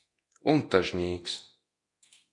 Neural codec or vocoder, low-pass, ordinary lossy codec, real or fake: codec, 24 kHz, 0.9 kbps, DualCodec; 10.8 kHz; AAC, 48 kbps; fake